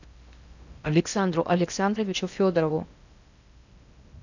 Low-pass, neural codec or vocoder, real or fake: 7.2 kHz; codec, 16 kHz in and 24 kHz out, 0.6 kbps, FocalCodec, streaming, 4096 codes; fake